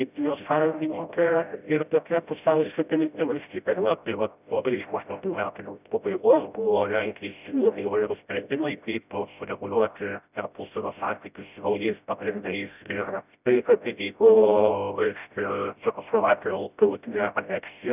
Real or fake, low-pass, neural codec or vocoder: fake; 3.6 kHz; codec, 16 kHz, 0.5 kbps, FreqCodec, smaller model